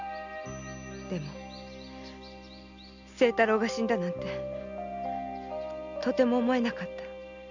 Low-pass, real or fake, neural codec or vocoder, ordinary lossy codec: 7.2 kHz; real; none; none